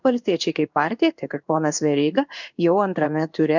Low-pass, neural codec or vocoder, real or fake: 7.2 kHz; codec, 16 kHz in and 24 kHz out, 1 kbps, XY-Tokenizer; fake